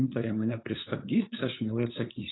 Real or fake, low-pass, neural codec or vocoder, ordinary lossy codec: fake; 7.2 kHz; codec, 16 kHz, 8 kbps, FunCodec, trained on LibriTTS, 25 frames a second; AAC, 16 kbps